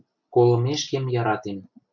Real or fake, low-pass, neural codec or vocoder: real; 7.2 kHz; none